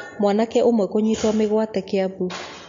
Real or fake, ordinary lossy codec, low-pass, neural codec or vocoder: real; MP3, 48 kbps; 7.2 kHz; none